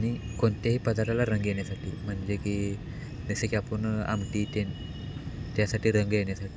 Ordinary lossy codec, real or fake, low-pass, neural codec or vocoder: none; real; none; none